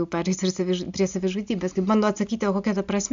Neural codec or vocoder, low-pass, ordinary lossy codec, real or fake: none; 7.2 kHz; MP3, 64 kbps; real